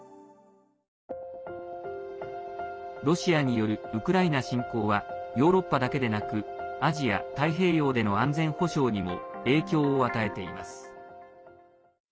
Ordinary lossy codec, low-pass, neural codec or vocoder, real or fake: none; none; none; real